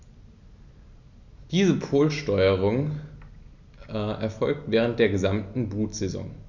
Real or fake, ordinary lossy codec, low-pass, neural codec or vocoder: real; none; 7.2 kHz; none